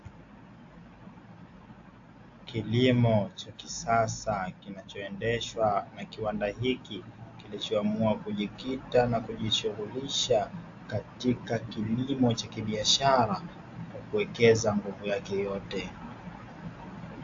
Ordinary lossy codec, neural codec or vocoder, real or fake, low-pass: AAC, 48 kbps; none; real; 7.2 kHz